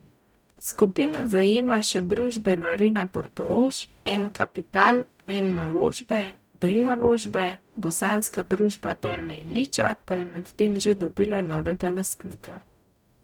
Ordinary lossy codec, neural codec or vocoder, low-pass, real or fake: none; codec, 44.1 kHz, 0.9 kbps, DAC; 19.8 kHz; fake